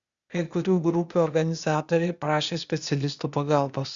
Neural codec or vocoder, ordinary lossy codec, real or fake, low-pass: codec, 16 kHz, 0.8 kbps, ZipCodec; Opus, 64 kbps; fake; 7.2 kHz